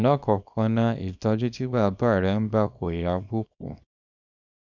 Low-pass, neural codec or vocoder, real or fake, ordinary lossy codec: 7.2 kHz; codec, 24 kHz, 0.9 kbps, WavTokenizer, small release; fake; none